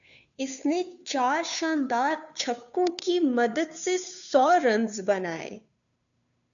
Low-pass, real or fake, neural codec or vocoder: 7.2 kHz; fake; codec, 16 kHz, 2 kbps, FunCodec, trained on Chinese and English, 25 frames a second